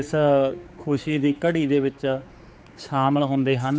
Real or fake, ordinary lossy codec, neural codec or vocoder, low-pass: fake; none; codec, 16 kHz, 4 kbps, X-Codec, HuBERT features, trained on general audio; none